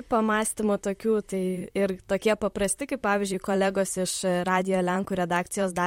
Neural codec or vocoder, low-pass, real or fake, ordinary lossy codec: vocoder, 44.1 kHz, 128 mel bands, Pupu-Vocoder; 19.8 kHz; fake; MP3, 64 kbps